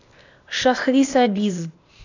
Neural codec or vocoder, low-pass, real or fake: codec, 16 kHz, 0.8 kbps, ZipCodec; 7.2 kHz; fake